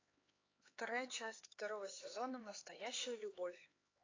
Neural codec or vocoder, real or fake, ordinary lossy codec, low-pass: codec, 16 kHz, 4 kbps, X-Codec, HuBERT features, trained on LibriSpeech; fake; AAC, 32 kbps; 7.2 kHz